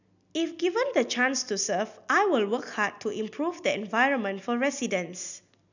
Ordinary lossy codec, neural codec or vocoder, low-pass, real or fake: none; none; 7.2 kHz; real